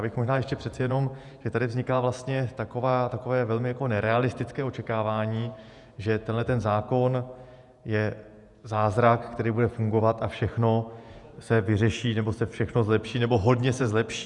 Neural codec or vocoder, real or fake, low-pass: vocoder, 48 kHz, 128 mel bands, Vocos; fake; 10.8 kHz